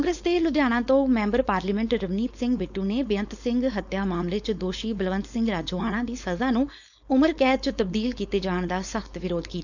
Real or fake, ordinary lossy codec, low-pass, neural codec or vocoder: fake; none; 7.2 kHz; codec, 16 kHz, 4.8 kbps, FACodec